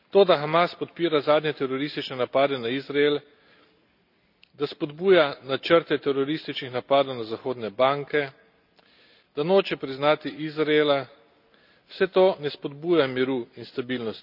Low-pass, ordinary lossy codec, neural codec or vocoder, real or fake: 5.4 kHz; none; none; real